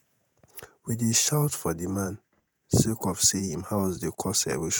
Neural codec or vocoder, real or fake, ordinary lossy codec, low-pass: vocoder, 48 kHz, 128 mel bands, Vocos; fake; none; none